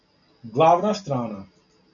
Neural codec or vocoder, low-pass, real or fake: none; 7.2 kHz; real